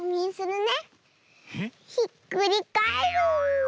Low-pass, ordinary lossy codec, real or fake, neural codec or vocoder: none; none; real; none